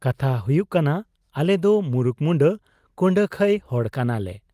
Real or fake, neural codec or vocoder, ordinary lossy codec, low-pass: real; none; Opus, 32 kbps; 14.4 kHz